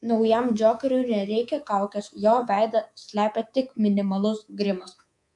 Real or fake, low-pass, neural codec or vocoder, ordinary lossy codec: fake; 10.8 kHz; codec, 24 kHz, 3.1 kbps, DualCodec; MP3, 96 kbps